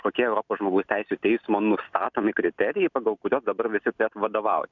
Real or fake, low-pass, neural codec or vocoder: real; 7.2 kHz; none